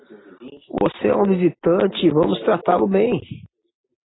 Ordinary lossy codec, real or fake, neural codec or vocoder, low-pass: AAC, 16 kbps; real; none; 7.2 kHz